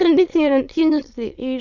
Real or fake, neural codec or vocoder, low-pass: fake; autoencoder, 22.05 kHz, a latent of 192 numbers a frame, VITS, trained on many speakers; 7.2 kHz